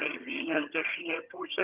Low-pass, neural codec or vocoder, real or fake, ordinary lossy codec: 3.6 kHz; vocoder, 22.05 kHz, 80 mel bands, HiFi-GAN; fake; Opus, 24 kbps